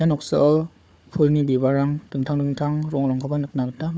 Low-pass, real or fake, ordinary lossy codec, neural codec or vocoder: none; fake; none; codec, 16 kHz, 4 kbps, FunCodec, trained on Chinese and English, 50 frames a second